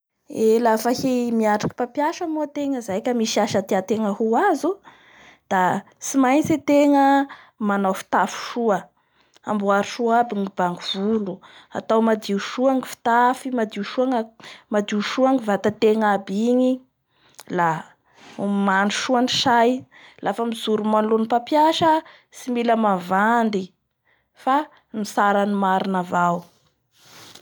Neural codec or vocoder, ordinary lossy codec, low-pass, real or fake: none; none; none; real